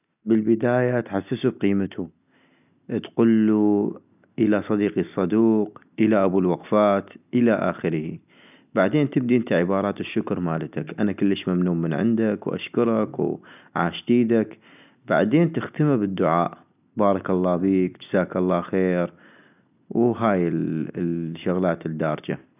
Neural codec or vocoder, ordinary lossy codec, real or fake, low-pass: none; none; real; 3.6 kHz